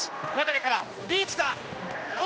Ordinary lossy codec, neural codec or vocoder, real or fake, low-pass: none; codec, 16 kHz, 1 kbps, X-Codec, HuBERT features, trained on general audio; fake; none